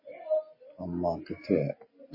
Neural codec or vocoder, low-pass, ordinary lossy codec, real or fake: none; 5.4 kHz; MP3, 24 kbps; real